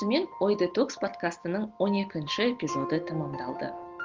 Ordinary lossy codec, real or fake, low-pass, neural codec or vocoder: Opus, 16 kbps; real; 7.2 kHz; none